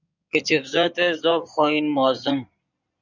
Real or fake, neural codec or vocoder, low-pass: fake; codec, 16 kHz in and 24 kHz out, 2.2 kbps, FireRedTTS-2 codec; 7.2 kHz